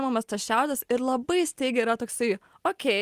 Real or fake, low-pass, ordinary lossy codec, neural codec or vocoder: real; 14.4 kHz; Opus, 32 kbps; none